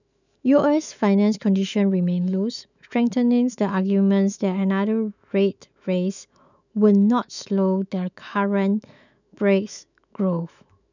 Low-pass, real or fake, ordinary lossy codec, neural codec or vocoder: 7.2 kHz; fake; none; autoencoder, 48 kHz, 128 numbers a frame, DAC-VAE, trained on Japanese speech